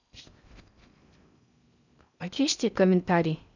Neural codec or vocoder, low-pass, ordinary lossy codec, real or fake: codec, 16 kHz in and 24 kHz out, 0.6 kbps, FocalCodec, streaming, 4096 codes; 7.2 kHz; none; fake